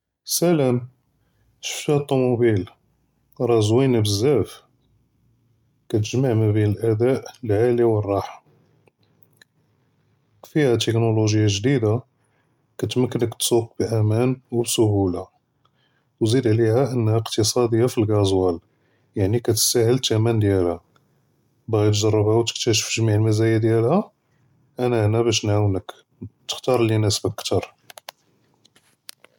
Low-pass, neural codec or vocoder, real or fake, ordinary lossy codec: 19.8 kHz; none; real; MP3, 96 kbps